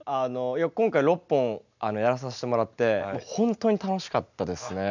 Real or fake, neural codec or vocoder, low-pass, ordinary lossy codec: real; none; 7.2 kHz; none